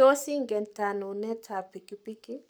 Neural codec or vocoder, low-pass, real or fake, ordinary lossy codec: codec, 44.1 kHz, 7.8 kbps, Pupu-Codec; none; fake; none